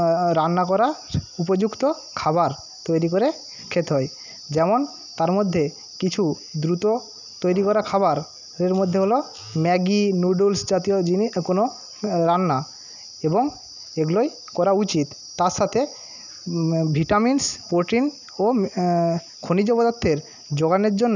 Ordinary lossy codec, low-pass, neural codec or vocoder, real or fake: none; 7.2 kHz; none; real